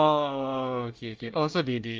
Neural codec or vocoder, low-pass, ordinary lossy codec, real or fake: codec, 24 kHz, 1 kbps, SNAC; 7.2 kHz; Opus, 24 kbps; fake